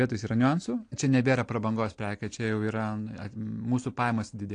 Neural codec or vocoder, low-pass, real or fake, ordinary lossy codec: none; 9.9 kHz; real; AAC, 48 kbps